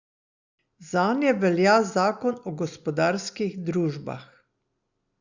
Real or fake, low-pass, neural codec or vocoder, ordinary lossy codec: real; 7.2 kHz; none; Opus, 64 kbps